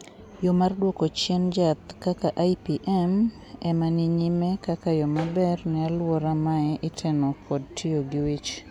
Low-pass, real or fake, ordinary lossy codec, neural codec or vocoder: 19.8 kHz; real; none; none